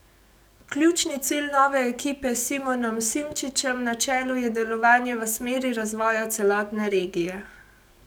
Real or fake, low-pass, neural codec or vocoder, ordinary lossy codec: fake; none; codec, 44.1 kHz, 7.8 kbps, DAC; none